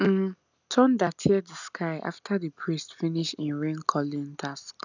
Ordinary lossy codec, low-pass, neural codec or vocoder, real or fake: none; 7.2 kHz; none; real